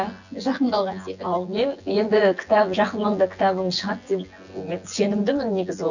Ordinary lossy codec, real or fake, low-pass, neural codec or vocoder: none; fake; 7.2 kHz; vocoder, 24 kHz, 100 mel bands, Vocos